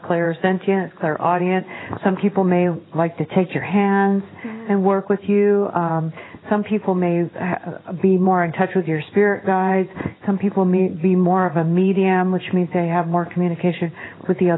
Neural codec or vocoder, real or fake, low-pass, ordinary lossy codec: codec, 16 kHz in and 24 kHz out, 1 kbps, XY-Tokenizer; fake; 7.2 kHz; AAC, 16 kbps